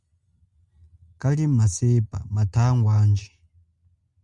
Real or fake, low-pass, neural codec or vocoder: real; 10.8 kHz; none